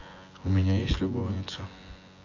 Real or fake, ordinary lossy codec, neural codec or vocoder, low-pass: fake; AAC, 32 kbps; vocoder, 24 kHz, 100 mel bands, Vocos; 7.2 kHz